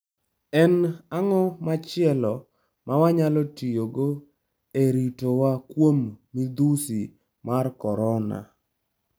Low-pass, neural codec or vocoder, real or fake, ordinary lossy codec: none; none; real; none